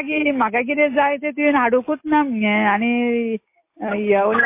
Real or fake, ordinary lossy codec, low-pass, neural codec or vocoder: real; MP3, 24 kbps; 3.6 kHz; none